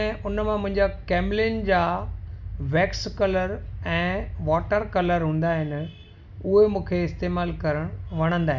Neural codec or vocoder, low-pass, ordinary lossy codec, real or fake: none; 7.2 kHz; none; real